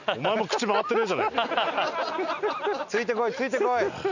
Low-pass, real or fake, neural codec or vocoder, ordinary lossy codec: 7.2 kHz; real; none; none